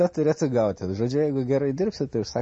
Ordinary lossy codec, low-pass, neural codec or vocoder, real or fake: MP3, 32 kbps; 10.8 kHz; none; real